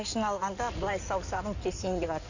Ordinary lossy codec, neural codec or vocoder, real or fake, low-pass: none; codec, 16 kHz in and 24 kHz out, 2.2 kbps, FireRedTTS-2 codec; fake; 7.2 kHz